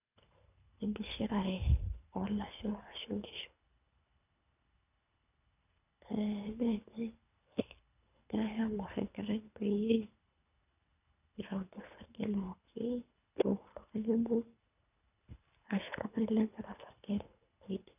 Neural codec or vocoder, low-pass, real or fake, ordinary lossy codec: codec, 24 kHz, 3 kbps, HILCodec; 3.6 kHz; fake; none